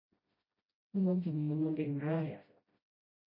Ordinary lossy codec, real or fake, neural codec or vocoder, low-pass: MP3, 24 kbps; fake; codec, 16 kHz, 0.5 kbps, FreqCodec, smaller model; 5.4 kHz